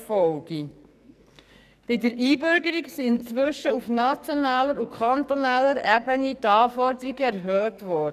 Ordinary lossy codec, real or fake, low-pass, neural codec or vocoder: none; fake; 14.4 kHz; codec, 44.1 kHz, 2.6 kbps, SNAC